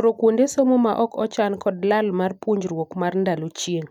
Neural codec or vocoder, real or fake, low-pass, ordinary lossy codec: none; real; 19.8 kHz; none